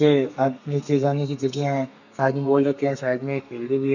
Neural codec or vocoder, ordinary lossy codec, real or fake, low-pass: codec, 32 kHz, 1.9 kbps, SNAC; none; fake; 7.2 kHz